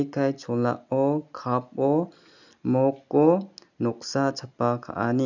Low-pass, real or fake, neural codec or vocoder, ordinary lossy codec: 7.2 kHz; real; none; none